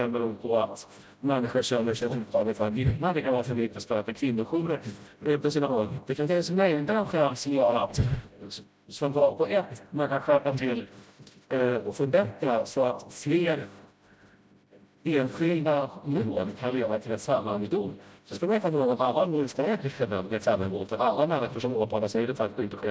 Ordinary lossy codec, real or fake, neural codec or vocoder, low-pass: none; fake; codec, 16 kHz, 0.5 kbps, FreqCodec, smaller model; none